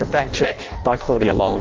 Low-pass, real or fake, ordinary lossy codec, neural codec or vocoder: 7.2 kHz; fake; Opus, 32 kbps; codec, 16 kHz in and 24 kHz out, 0.6 kbps, FireRedTTS-2 codec